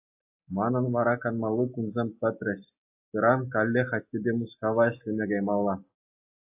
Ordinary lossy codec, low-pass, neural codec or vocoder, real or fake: AAC, 32 kbps; 3.6 kHz; none; real